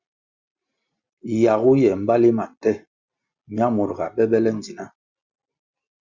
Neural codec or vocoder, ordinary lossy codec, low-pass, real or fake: none; Opus, 64 kbps; 7.2 kHz; real